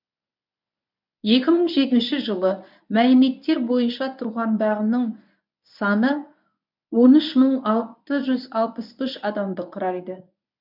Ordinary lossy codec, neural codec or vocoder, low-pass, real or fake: none; codec, 24 kHz, 0.9 kbps, WavTokenizer, medium speech release version 1; 5.4 kHz; fake